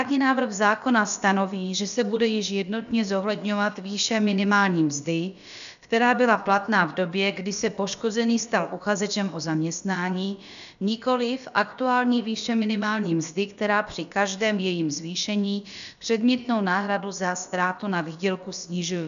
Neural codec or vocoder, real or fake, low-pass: codec, 16 kHz, about 1 kbps, DyCAST, with the encoder's durations; fake; 7.2 kHz